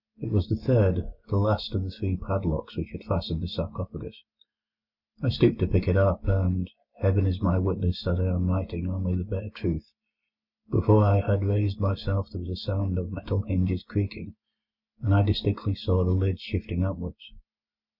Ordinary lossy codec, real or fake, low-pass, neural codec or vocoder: Opus, 64 kbps; real; 5.4 kHz; none